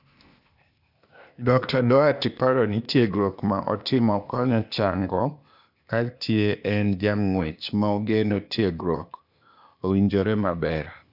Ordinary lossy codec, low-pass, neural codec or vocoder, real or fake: none; 5.4 kHz; codec, 16 kHz, 0.8 kbps, ZipCodec; fake